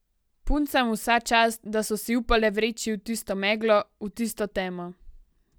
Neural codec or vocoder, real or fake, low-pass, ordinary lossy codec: none; real; none; none